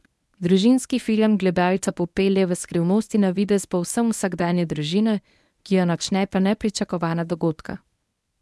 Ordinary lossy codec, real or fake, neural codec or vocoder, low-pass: none; fake; codec, 24 kHz, 0.9 kbps, WavTokenizer, medium speech release version 1; none